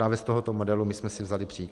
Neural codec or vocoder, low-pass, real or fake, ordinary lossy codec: none; 10.8 kHz; real; Opus, 24 kbps